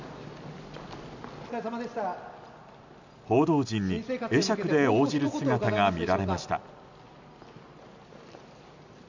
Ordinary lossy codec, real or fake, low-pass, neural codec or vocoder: none; real; 7.2 kHz; none